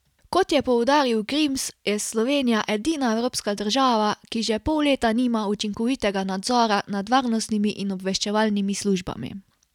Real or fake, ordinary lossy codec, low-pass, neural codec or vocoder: real; none; 19.8 kHz; none